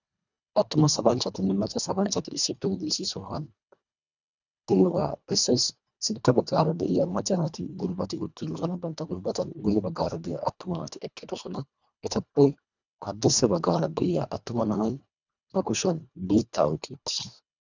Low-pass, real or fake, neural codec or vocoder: 7.2 kHz; fake; codec, 24 kHz, 1.5 kbps, HILCodec